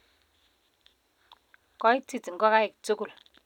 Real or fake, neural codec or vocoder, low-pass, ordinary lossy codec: real; none; 19.8 kHz; none